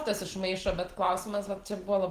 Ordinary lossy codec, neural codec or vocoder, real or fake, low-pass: Opus, 16 kbps; none; real; 14.4 kHz